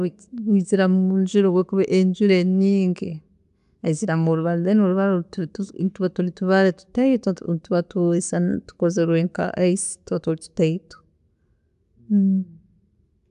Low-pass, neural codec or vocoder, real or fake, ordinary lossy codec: 10.8 kHz; none; real; AAC, 96 kbps